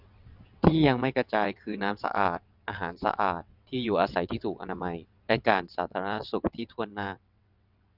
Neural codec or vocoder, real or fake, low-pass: vocoder, 22.05 kHz, 80 mel bands, WaveNeXt; fake; 5.4 kHz